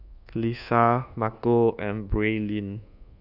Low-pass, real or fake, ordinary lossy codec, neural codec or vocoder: 5.4 kHz; fake; none; codec, 24 kHz, 1.2 kbps, DualCodec